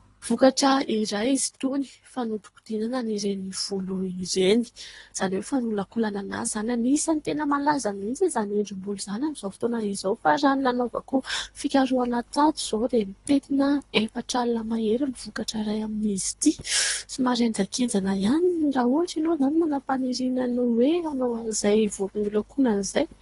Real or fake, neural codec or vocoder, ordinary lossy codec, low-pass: fake; codec, 24 kHz, 3 kbps, HILCodec; AAC, 32 kbps; 10.8 kHz